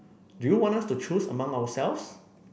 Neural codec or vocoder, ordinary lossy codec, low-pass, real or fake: none; none; none; real